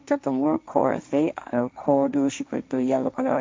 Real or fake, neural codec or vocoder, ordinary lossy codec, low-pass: fake; codec, 16 kHz, 1.1 kbps, Voila-Tokenizer; none; none